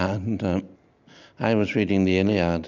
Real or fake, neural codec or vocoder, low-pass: real; none; 7.2 kHz